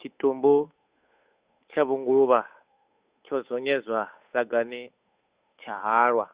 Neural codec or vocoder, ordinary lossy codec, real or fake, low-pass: codec, 16 kHz, 8 kbps, FunCodec, trained on Chinese and English, 25 frames a second; Opus, 64 kbps; fake; 3.6 kHz